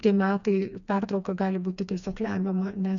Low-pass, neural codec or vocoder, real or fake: 7.2 kHz; codec, 16 kHz, 2 kbps, FreqCodec, smaller model; fake